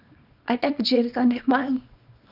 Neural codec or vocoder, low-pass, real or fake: codec, 24 kHz, 0.9 kbps, WavTokenizer, small release; 5.4 kHz; fake